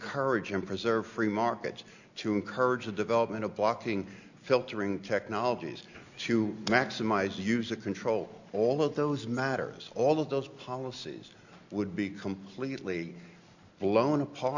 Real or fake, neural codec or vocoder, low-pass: real; none; 7.2 kHz